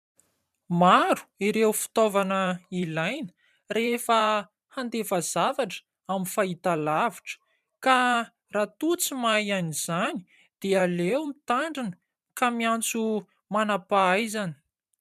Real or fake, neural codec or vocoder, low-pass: fake; vocoder, 44.1 kHz, 128 mel bands every 512 samples, BigVGAN v2; 14.4 kHz